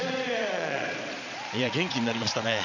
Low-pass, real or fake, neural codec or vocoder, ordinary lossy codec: 7.2 kHz; fake; vocoder, 22.05 kHz, 80 mel bands, Vocos; none